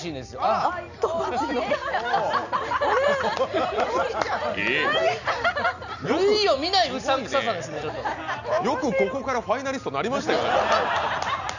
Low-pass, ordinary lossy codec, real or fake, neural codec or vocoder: 7.2 kHz; none; real; none